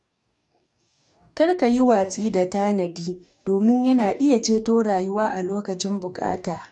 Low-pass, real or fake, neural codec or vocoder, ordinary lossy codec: 10.8 kHz; fake; codec, 44.1 kHz, 2.6 kbps, DAC; none